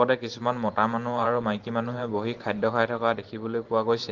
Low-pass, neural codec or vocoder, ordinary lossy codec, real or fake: 7.2 kHz; vocoder, 44.1 kHz, 128 mel bands every 512 samples, BigVGAN v2; Opus, 16 kbps; fake